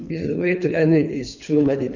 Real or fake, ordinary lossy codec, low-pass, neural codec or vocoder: fake; none; 7.2 kHz; codec, 24 kHz, 3 kbps, HILCodec